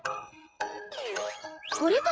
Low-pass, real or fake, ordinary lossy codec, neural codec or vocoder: none; fake; none; codec, 16 kHz, 8 kbps, FreqCodec, smaller model